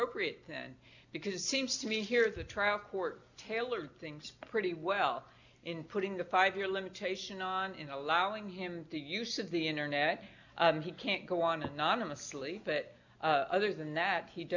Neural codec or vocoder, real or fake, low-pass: none; real; 7.2 kHz